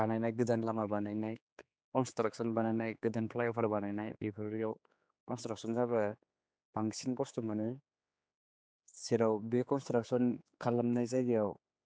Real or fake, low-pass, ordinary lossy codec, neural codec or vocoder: fake; none; none; codec, 16 kHz, 4 kbps, X-Codec, HuBERT features, trained on general audio